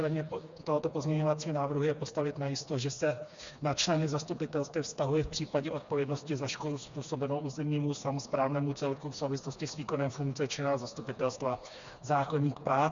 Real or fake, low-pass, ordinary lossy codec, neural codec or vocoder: fake; 7.2 kHz; Opus, 64 kbps; codec, 16 kHz, 2 kbps, FreqCodec, smaller model